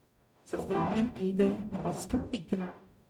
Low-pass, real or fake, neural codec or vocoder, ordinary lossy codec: 19.8 kHz; fake; codec, 44.1 kHz, 0.9 kbps, DAC; none